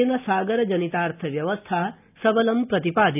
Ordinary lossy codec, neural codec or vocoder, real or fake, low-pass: none; none; real; 3.6 kHz